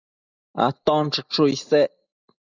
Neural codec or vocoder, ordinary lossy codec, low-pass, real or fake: none; Opus, 64 kbps; 7.2 kHz; real